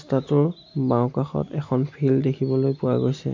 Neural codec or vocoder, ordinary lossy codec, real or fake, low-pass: none; MP3, 48 kbps; real; 7.2 kHz